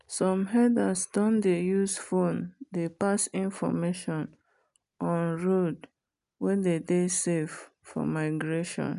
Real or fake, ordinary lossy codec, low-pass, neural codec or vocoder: real; none; 10.8 kHz; none